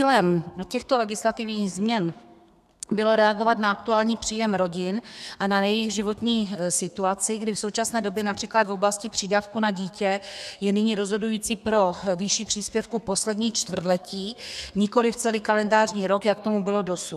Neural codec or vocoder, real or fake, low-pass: codec, 32 kHz, 1.9 kbps, SNAC; fake; 14.4 kHz